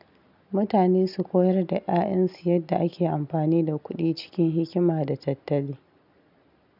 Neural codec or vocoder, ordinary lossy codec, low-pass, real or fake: none; none; 5.4 kHz; real